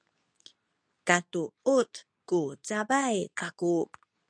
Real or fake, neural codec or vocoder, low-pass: fake; codec, 24 kHz, 0.9 kbps, WavTokenizer, medium speech release version 2; 9.9 kHz